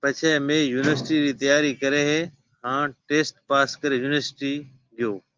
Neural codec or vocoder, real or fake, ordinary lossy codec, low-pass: none; real; Opus, 32 kbps; 7.2 kHz